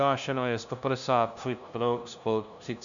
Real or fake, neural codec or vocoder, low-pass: fake; codec, 16 kHz, 0.5 kbps, FunCodec, trained on LibriTTS, 25 frames a second; 7.2 kHz